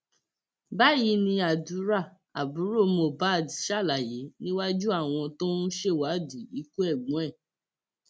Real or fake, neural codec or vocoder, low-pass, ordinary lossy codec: real; none; none; none